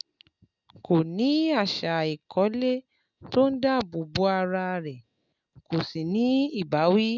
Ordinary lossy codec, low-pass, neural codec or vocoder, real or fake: none; 7.2 kHz; none; real